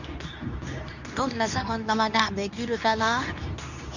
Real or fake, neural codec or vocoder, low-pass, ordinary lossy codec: fake; codec, 24 kHz, 0.9 kbps, WavTokenizer, medium speech release version 2; 7.2 kHz; none